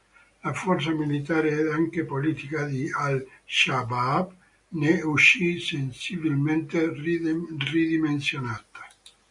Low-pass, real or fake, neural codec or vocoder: 10.8 kHz; real; none